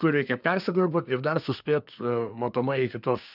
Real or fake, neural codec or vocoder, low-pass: fake; codec, 24 kHz, 1 kbps, SNAC; 5.4 kHz